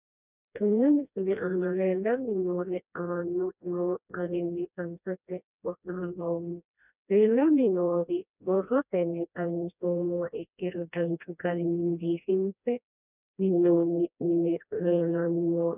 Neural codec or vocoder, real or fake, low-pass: codec, 16 kHz, 1 kbps, FreqCodec, smaller model; fake; 3.6 kHz